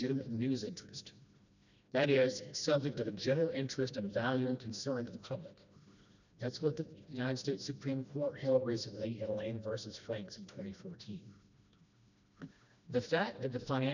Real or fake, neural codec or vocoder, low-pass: fake; codec, 16 kHz, 1 kbps, FreqCodec, smaller model; 7.2 kHz